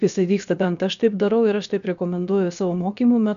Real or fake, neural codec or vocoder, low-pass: fake; codec, 16 kHz, about 1 kbps, DyCAST, with the encoder's durations; 7.2 kHz